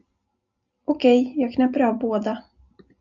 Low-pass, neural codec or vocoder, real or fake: 7.2 kHz; none; real